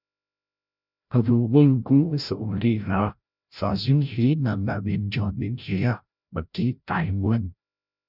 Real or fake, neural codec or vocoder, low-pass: fake; codec, 16 kHz, 0.5 kbps, FreqCodec, larger model; 5.4 kHz